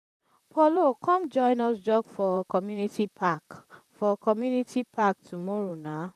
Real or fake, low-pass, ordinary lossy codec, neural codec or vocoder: fake; 14.4 kHz; none; vocoder, 44.1 kHz, 128 mel bands, Pupu-Vocoder